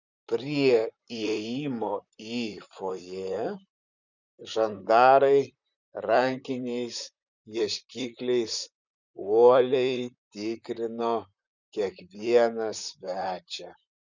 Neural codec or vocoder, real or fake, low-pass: vocoder, 44.1 kHz, 128 mel bands, Pupu-Vocoder; fake; 7.2 kHz